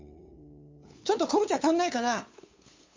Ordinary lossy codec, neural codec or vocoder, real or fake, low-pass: MP3, 48 kbps; codec, 16 kHz, 8 kbps, FunCodec, trained on LibriTTS, 25 frames a second; fake; 7.2 kHz